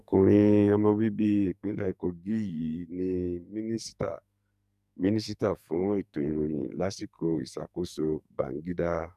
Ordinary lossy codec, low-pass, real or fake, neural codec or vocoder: none; 14.4 kHz; fake; codec, 44.1 kHz, 2.6 kbps, SNAC